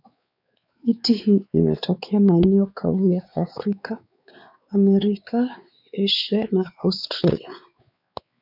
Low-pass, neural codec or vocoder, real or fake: 5.4 kHz; codec, 16 kHz, 4 kbps, X-Codec, WavLM features, trained on Multilingual LibriSpeech; fake